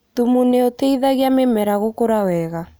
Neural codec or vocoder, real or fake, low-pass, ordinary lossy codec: none; real; none; none